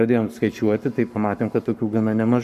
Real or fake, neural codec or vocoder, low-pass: fake; codec, 44.1 kHz, 7.8 kbps, Pupu-Codec; 14.4 kHz